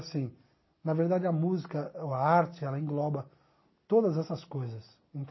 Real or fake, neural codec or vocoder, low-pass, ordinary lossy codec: real; none; 7.2 kHz; MP3, 24 kbps